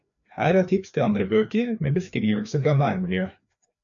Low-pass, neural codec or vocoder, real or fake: 7.2 kHz; codec, 16 kHz, 2 kbps, FreqCodec, larger model; fake